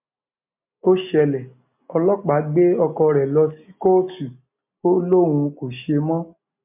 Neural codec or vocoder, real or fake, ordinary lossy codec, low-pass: none; real; MP3, 32 kbps; 3.6 kHz